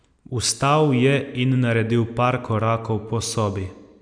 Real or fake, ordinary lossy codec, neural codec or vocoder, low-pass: real; none; none; 9.9 kHz